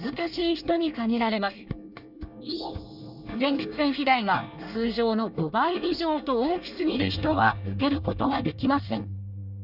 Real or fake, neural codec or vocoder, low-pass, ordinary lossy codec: fake; codec, 24 kHz, 1 kbps, SNAC; 5.4 kHz; Opus, 64 kbps